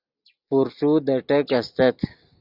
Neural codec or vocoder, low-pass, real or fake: none; 5.4 kHz; real